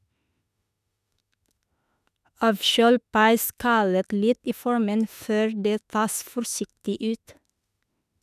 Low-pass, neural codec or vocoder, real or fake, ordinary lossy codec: 14.4 kHz; autoencoder, 48 kHz, 32 numbers a frame, DAC-VAE, trained on Japanese speech; fake; none